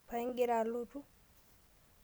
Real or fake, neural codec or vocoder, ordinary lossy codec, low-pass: real; none; none; none